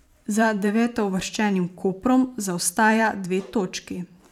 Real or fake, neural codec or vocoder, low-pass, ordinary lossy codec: fake; vocoder, 44.1 kHz, 128 mel bands every 512 samples, BigVGAN v2; 19.8 kHz; none